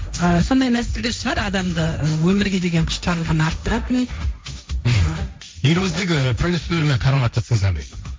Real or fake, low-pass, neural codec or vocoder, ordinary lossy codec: fake; none; codec, 16 kHz, 1.1 kbps, Voila-Tokenizer; none